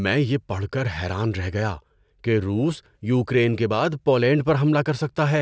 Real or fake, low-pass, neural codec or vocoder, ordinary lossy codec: real; none; none; none